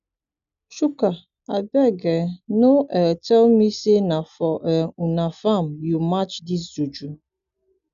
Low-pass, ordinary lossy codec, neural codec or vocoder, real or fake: 7.2 kHz; none; none; real